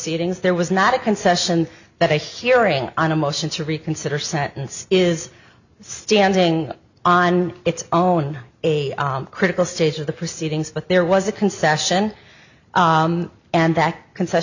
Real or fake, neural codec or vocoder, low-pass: real; none; 7.2 kHz